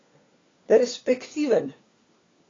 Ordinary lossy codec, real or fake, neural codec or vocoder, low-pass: AAC, 32 kbps; fake; codec, 16 kHz, 4 kbps, FunCodec, trained on LibriTTS, 50 frames a second; 7.2 kHz